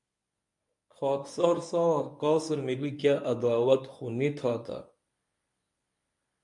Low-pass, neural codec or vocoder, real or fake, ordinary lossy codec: 10.8 kHz; codec, 24 kHz, 0.9 kbps, WavTokenizer, medium speech release version 1; fake; MP3, 64 kbps